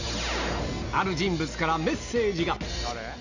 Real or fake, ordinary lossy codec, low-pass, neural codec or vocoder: real; none; 7.2 kHz; none